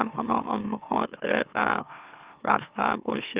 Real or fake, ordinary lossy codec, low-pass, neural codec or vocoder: fake; Opus, 32 kbps; 3.6 kHz; autoencoder, 44.1 kHz, a latent of 192 numbers a frame, MeloTTS